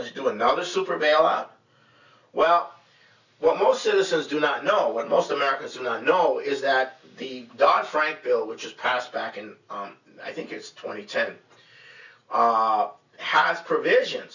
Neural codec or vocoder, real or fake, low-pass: none; real; 7.2 kHz